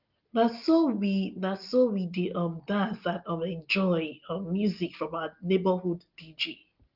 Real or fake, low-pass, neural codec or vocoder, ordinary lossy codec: real; 5.4 kHz; none; Opus, 32 kbps